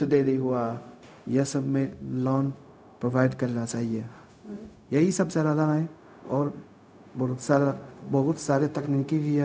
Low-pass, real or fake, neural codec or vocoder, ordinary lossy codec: none; fake; codec, 16 kHz, 0.4 kbps, LongCat-Audio-Codec; none